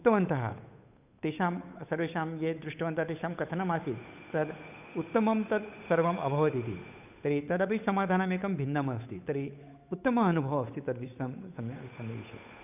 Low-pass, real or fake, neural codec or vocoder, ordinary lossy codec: 3.6 kHz; fake; codec, 16 kHz, 8 kbps, FunCodec, trained on Chinese and English, 25 frames a second; none